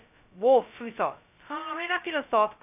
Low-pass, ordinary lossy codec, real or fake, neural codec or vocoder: 3.6 kHz; none; fake; codec, 16 kHz, 0.2 kbps, FocalCodec